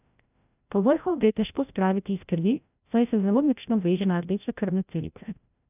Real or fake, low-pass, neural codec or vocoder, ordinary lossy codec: fake; 3.6 kHz; codec, 16 kHz, 0.5 kbps, FreqCodec, larger model; none